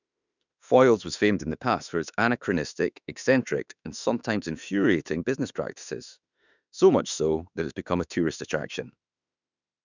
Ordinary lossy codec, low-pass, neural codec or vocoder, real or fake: none; 7.2 kHz; autoencoder, 48 kHz, 32 numbers a frame, DAC-VAE, trained on Japanese speech; fake